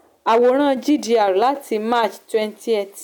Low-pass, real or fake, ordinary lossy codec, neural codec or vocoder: 19.8 kHz; real; none; none